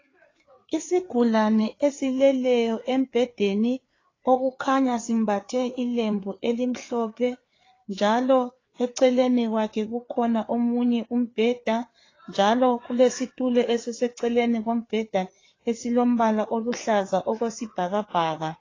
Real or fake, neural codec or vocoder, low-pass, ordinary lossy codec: fake; codec, 16 kHz, 4 kbps, FreqCodec, larger model; 7.2 kHz; AAC, 32 kbps